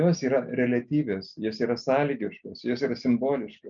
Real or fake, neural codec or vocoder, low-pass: real; none; 7.2 kHz